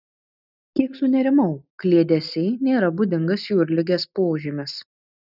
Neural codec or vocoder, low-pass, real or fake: none; 5.4 kHz; real